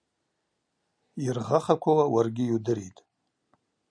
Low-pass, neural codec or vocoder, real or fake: 9.9 kHz; none; real